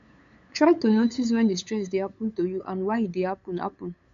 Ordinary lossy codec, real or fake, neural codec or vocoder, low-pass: MP3, 64 kbps; fake; codec, 16 kHz, 8 kbps, FunCodec, trained on LibriTTS, 25 frames a second; 7.2 kHz